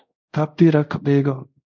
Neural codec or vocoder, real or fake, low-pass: codec, 24 kHz, 0.5 kbps, DualCodec; fake; 7.2 kHz